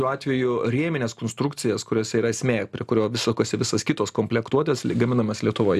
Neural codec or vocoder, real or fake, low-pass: none; real; 14.4 kHz